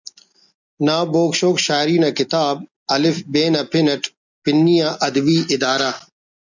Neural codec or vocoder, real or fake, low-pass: none; real; 7.2 kHz